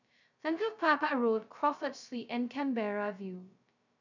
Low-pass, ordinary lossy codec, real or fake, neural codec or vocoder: 7.2 kHz; none; fake; codec, 16 kHz, 0.2 kbps, FocalCodec